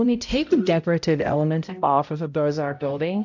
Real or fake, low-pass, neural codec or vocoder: fake; 7.2 kHz; codec, 16 kHz, 0.5 kbps, X-Codec, HuBERT features, trained on balanced general audio